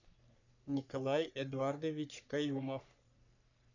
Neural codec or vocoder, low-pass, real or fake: codec, 44.1 kHz, 3.4 kbps, Pupu-Codec; 7.2 kHz; fake